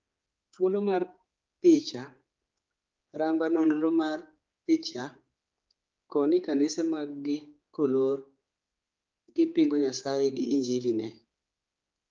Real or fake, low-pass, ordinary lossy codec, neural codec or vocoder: fake; 7.2 kHz; Opus, 24 kbps; codec, 16 kHz, 4 kbps, X-Codec, HuBERT features, trained on general audio